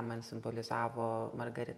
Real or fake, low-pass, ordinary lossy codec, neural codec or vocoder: fake; 19.8 kHz; MP3, 64 kbps; vocoder, 48 kHz, 128 mel bands, Vocos